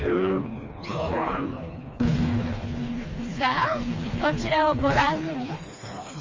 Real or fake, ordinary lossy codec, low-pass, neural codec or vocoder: fake; Opus, 32 kbps; 7.2 kHz; codec, 16 kHz, 2 kbps, FreqCodec, smaller model